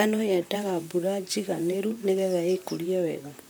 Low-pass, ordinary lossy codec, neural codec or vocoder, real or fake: none; none; vocoder, 44.1 kHz, 128 mel bands, Pupu-Vocoder; fake